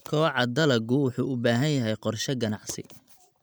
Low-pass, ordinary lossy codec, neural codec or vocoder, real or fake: none; none; none; real